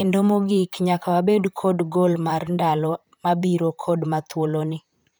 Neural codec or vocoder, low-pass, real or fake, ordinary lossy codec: vocoder, 44.1 kHz, 128 mel bands, Pupu-Vocoder; none; fake; none